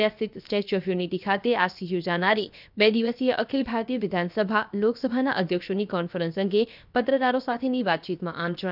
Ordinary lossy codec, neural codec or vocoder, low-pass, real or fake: none; codec, 16 kHz, 0.7 kbps, FocalCodec; 5.4 kHz; fake